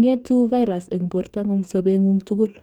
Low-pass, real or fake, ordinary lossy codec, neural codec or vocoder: 19.8 kHz; fake; none; codec, 44.1 kHz, 2.6 kbps, DAC